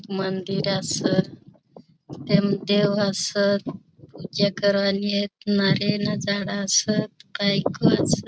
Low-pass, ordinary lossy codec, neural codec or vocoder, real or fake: none; none; none; real